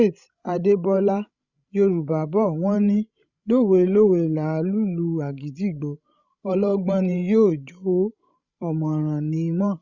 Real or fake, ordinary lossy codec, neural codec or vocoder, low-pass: fake; Opus, 64 kbps; codec, 16 kHz, 16 kbps, FreqCodec, larger model; 7.2 kHz